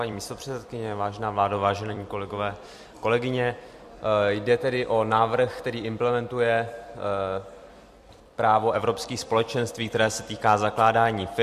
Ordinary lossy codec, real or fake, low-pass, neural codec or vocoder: MP3, 64 kbps; fake; 14.4 kHz; vocoder, 44.1 kHz, 128 mel bands every 256 samples, BigVGAN v2